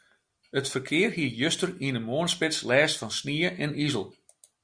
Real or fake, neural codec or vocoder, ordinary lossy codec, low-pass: real; none; Opus, 64 kbps; 9.9 kHz